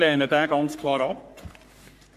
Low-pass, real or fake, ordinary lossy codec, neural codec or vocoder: 14.4 kHz; fake; AAC, 96 kbps; codec, 44.1 kHz, 3.4 kbps, Pupu-Codec